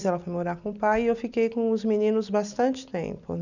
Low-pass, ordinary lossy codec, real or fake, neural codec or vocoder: 7.2 kHz; none; real; none